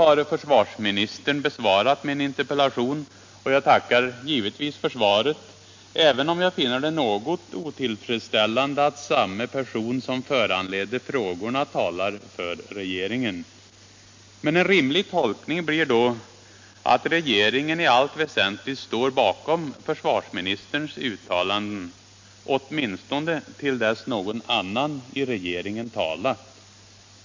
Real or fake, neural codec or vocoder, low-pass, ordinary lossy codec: real; none; 7.2 kHz; MP3, 48 kbps